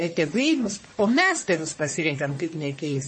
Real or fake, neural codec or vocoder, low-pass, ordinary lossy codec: fake; codec, 44.1 kHz, 1.7 kbps, Pupu-Codec; 9.9 kHz; MP3, 32 kbps